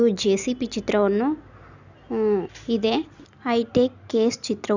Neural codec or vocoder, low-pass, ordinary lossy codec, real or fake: none; 7.2 kHz; none; real